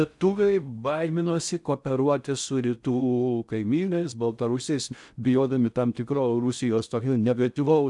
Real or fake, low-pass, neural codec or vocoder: fake; 10.8 kHz; codec, 16 kHz in and 24 kHz out, 0.6 kbps, FocalCodec, streaming, 4096 codes